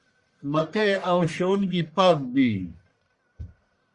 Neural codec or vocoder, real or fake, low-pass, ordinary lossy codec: codec, 44.1 kHz, 1.7 kbps, Pupu-Codec; fake; 10.8 kHz; Opus, 64 kbps